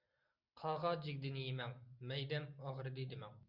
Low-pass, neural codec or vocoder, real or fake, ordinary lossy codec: 5.4 kHz; none; real; Opus, 64 kbps